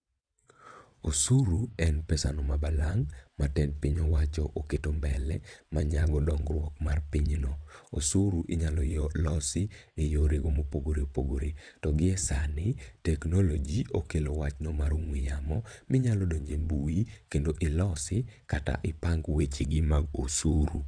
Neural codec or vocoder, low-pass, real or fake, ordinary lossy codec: vocoder, 22.05 kHz, 80 mel bands, WaveNeXt; 9.9 kHz; fake; none